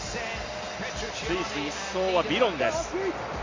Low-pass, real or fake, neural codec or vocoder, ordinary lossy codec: 7.2 kHz; real; none; AAC, 32 kbps